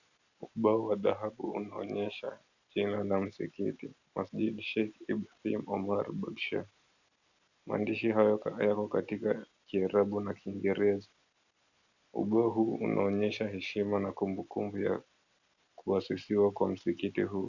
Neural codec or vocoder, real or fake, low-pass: none; real; 7.2 kHz